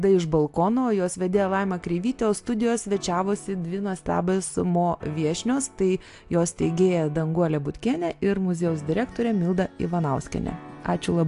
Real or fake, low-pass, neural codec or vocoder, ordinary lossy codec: real; 10.8 kHz; none; AAC, 64 kbps